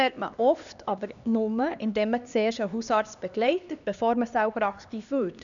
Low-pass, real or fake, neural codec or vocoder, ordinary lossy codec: 7.2 kHz; fake; codec, 16 kHz, 2 kbps, X-Codec, HuBERT features, trained on LibriSpeech; none